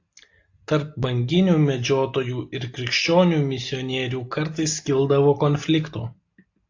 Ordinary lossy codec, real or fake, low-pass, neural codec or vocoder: AAC, 48 kbps; real; 7.2 kHz; none